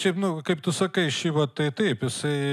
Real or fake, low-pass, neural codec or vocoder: real; 14.4 kHz; none